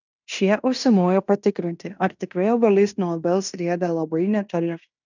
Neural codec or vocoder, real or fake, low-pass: codec, 16 kHz in and 24 kHz out, 0.9 kbps, LongCat-Audio-Codec, fine tuned four codebook decoder; fake; 7.2 kHz